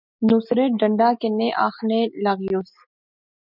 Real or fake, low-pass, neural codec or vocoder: real; 5.4 kHz; none